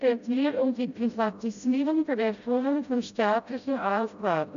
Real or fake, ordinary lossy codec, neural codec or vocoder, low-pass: fake; none; codec, 16 kHz, 0.5 kbps, FreqCodec, smaller model; 7.2 kHz